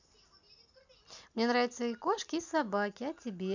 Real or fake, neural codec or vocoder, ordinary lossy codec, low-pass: real; none; none; 7.2 kHz